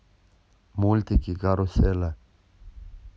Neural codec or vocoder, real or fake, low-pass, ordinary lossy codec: none; real; none; none